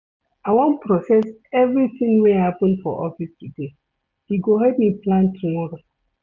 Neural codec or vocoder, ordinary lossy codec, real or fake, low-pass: none; none; real; 7.2 kHz